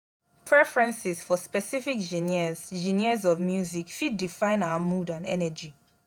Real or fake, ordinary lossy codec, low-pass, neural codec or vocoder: fake; none; none; vocoder, 48 kHz, 128 mel bands, Vocos